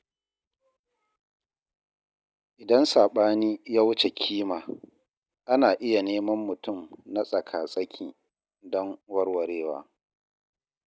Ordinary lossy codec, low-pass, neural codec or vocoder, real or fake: none; none; none; real